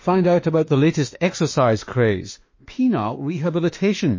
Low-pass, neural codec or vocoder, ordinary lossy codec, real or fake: 7.2 kHz; autoencoder, 48 kHz, 32 numbers a frame, DAC-VAE, trained on Japanese speech; MP3, 32 kbps; fake